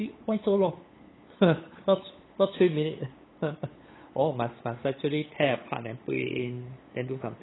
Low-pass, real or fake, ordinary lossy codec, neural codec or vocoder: 7.2 kHz; fake; AAC, 16 kbps; codec, 16 kHz, 8 kbps, FunCodec, trained on LibriTTS, 25 frames a second